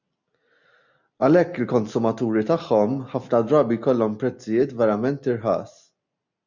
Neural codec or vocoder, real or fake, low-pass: none; real; 7.2 kHz